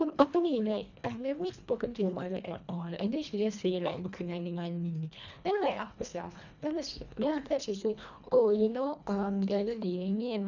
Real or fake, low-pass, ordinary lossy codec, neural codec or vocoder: fake; 7.2 kHz; none; codec, 24 kHz, 1.5 kbps, HILCodec